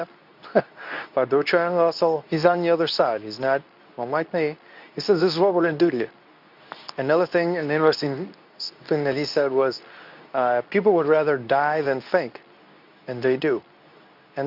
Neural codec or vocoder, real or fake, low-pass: codec, 24 kHz, 0.9 kbps, WavTokenizer, medium speech release version 2; fake; 5.4 kHz